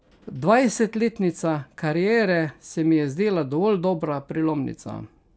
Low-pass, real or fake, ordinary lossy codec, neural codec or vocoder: none; real; none; none